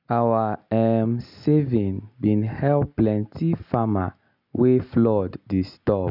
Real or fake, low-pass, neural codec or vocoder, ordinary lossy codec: real; 5.4 kHz; none; none